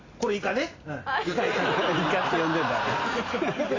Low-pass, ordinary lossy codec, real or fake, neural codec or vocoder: 7.2 kHz; AAC, 32 kbps; real; none